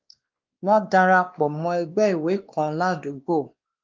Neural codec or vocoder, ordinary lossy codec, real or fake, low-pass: codec, 16 kHz, 2 kbps, X-Codec, WavLM features, trained on Multilingual LibriSpeech; Opus, 32 kbps; fake; 7.2 kHz